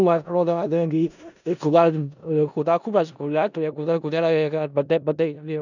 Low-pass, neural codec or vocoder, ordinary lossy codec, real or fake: 7.2 kHz; codec, 16 kHz in and 24 kHz out, 0.4 kbps, LongCat-Audio-Codec, four codebook decoder; none; fake